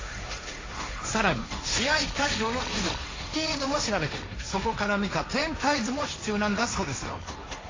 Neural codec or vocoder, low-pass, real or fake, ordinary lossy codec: codec, 16 kHz, 1.1 kbps, Voila-Tokenizer; 7.2 kHz; fake; AAC, 32 kbps